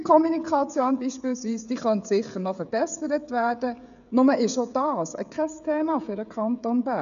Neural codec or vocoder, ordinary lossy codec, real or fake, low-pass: codec, 16 kHz, 16 kbps, FreqCodec, smaller model; none; fake; 7.2 kHz